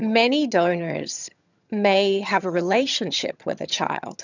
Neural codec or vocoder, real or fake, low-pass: vocoder, 22.05 kHz, 80 mel bands, HiFi-GAN; fake; 7.2 kHz